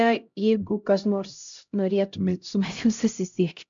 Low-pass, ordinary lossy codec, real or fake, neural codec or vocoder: 7.2 kHz; MP3, 64 kbps; fake; codec, 16 kHz, 0.5 kbps, X-Codec, HuBERT features, trained on LibriSpeech